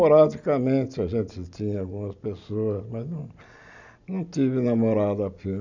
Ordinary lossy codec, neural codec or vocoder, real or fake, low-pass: none; none; real; 7.2 kHz